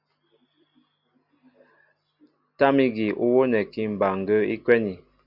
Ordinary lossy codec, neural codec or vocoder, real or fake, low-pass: Opus, 64 kbps; none; real; 5.4 kHz